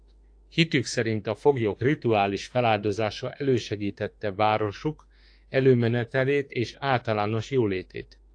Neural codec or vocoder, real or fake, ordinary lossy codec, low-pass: autoencoder, 48 kHz, 32 numbers a frame, DAC-VAE, trained on Japanese speech; fake; AAC, 48 kbps; 9.9 kHz